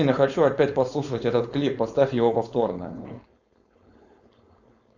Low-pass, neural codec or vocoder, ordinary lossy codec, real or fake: 7.2 kHz; codec, 16 kHz, 4.8 kbps, FACodec; Opus, 64 kbps; fake